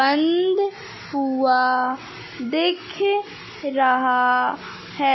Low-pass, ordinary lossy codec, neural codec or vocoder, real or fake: 7.2 kHz; MP3, 24 kbps; none; real